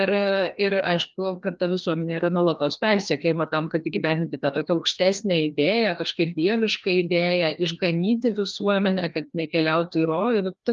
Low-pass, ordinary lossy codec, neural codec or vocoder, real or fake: 7.2 kHz; Opus, 32 kbps; codec, 16 kHz, 1 kbps, FreqCodec, larger model; fake